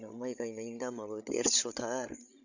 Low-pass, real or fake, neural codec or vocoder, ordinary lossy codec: 7.2 kHz; fake; codec, 16 kHz, 16 kbps, FreqCodec, larger model; none